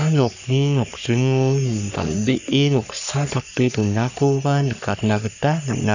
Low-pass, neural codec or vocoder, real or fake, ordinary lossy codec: 7.2 kHz; codec, 44.1 kHz, 3.4 kbps, Pupu-Codec; fake; none